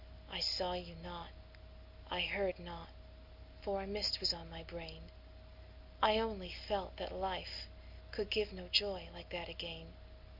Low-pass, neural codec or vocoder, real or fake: 5.4 kHz; none; real